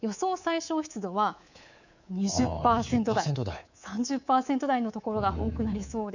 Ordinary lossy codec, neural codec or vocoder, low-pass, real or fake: none; codec, 24 kHz, 3.1 kbps, DualCodec; 7.2 kHz; fake